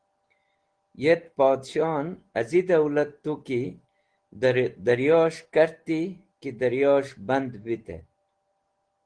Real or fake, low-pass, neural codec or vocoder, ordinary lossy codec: real; 9.9 kHz; none; Opus, 16 kbps